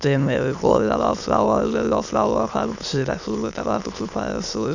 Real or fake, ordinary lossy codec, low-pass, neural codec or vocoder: fake; none; 7.2 kHz; autoencoder, 22.05 kHz, a latent of 192 numbers a frame, VITS, trained on many speakers